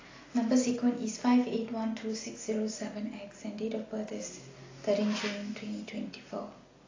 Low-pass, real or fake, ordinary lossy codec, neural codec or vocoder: 7.2 kHz; real; AAC, 32 kbps; none